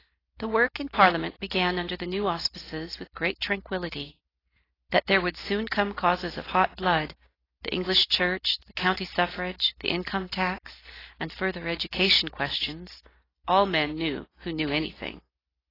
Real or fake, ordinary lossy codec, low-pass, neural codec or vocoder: real; AAC, 24 kbps; 5.4 kHz; none